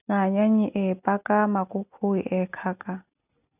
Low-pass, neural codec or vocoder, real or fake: 3.6 kHz; none; real